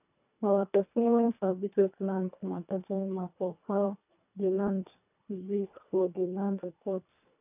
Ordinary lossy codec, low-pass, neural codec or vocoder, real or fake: none; 3.6 kHz; codec, 24 kHz, 1.5 kbps, HILCodec; fake